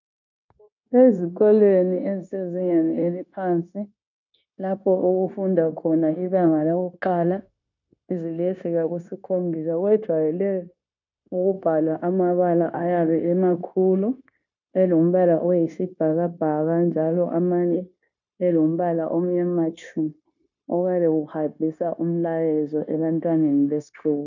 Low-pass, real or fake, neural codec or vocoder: 7.2 kHz; fake; codec, 16 kHz, 0.9 kbps, LongCat-Audio-Codec